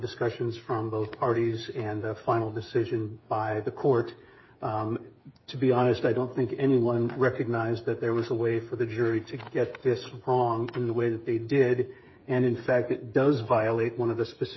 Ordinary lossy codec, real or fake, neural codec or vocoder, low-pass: MP3, 24 kbps; fake; codec, 16 kHz, 8 kbps, FreqCodec, smaller model; 7.2 kHz